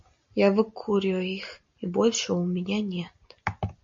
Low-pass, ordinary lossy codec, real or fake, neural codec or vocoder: 7.2 kHz; MP3, 48 kbps; real; none